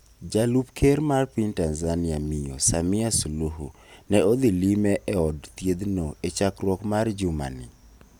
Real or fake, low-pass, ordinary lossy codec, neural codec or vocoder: real; none; none; none